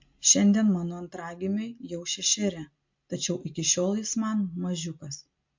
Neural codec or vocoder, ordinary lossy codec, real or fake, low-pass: none; MP3, 48 kbps; real; 7.2 kHz